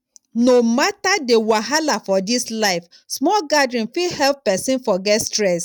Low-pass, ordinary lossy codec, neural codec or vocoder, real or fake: 19.8 kHz; none; none; real